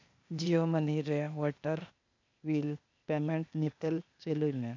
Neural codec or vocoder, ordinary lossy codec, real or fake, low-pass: codec, 16 kHz, 0.8 kbps, ZipCodec; MP3, 64 kbps; fake; 7.2 kHz